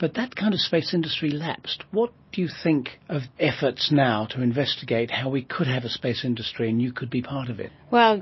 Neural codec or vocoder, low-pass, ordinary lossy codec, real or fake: none; 7.2 kHz; MP3, 24 kbps; real